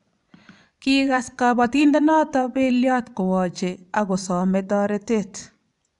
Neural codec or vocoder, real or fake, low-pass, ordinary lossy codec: vocoder, 24 kHz, 100 mel bands, Vocos; fake; 10.8 kHz; none